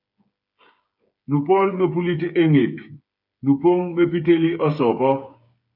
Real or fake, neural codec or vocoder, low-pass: fake; codec, 16 kHz, 8 kbps, FreqCodec, smaller model; 5.4 kHz